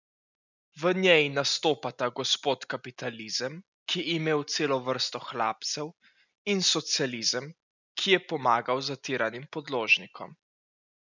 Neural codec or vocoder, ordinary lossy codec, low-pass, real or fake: none; none; 7.2 kHz; real